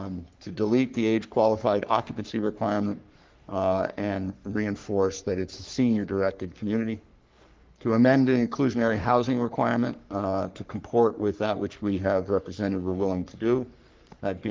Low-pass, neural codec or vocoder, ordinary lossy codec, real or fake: 7.2 kHz; codec, 44.1 kHz, 3.4 kbps, Pupu-Codec; Opus, 32 kbps; fake